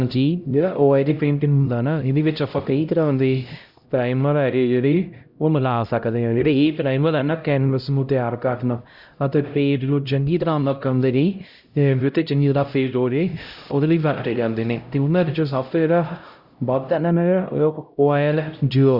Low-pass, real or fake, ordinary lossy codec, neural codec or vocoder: 5.4 kHz; fake; Opus, 64 kbps; codec, 16 kHz, 0.5 kbps, X-Codec, HuBERT features, trained on LibriSpeech